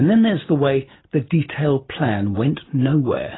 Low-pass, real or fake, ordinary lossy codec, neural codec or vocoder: 7.2 kHz; real; AAC, 16 kbps; none